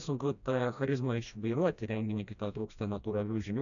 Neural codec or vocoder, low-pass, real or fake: codec, 16 kHz, 1 kbps, FreqCodec, smaller model; 7.2 kHz; fake